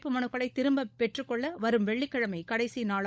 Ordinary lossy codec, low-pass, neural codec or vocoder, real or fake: none; none; codec, 16 kHz, 16 kbps, FunCodec, trained on LibriTTS, 50 frames a second; fake